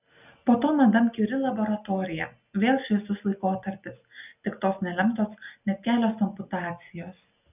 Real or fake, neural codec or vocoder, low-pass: real; none; 3.6 kHz